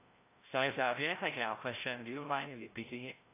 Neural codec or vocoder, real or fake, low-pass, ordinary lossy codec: codec, 16 kHz, 0.5 kbps, FreqCodec, larger model; fake; 3.6 kHz; AAC, 24 kbps